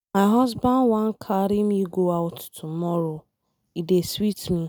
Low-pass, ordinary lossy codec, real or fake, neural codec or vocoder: none; none; real; none